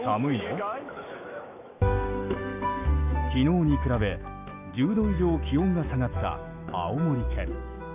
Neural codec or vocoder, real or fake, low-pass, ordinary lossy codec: autoencoder, 48 kHz, 128 numbers a frame, DAC-VAE, trained on Japanese speech; fake; 3.6 kHz; none